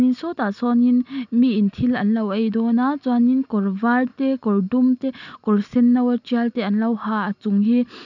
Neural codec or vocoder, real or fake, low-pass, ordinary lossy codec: none; real; 7.2 kHz; none